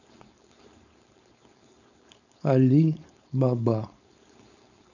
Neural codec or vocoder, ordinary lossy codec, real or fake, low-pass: codec, 16 kHz, 4.8 kbps, FACodec; none; fake; 7.2 kHz